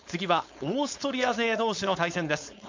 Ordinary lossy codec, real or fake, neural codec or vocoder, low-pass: MP3, 64 kbps; fake; codec, 16 kHz, 4.8 kbps, FACodec; 7.2 kHz